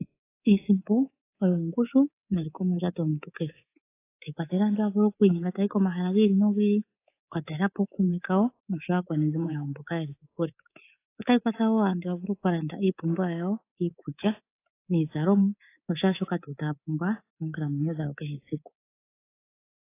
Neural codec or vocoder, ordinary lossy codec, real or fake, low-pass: codec, 24 kHz, 3.1 kbps, DualCodec; AAC, 24 kbps; fake; 3.6 kHz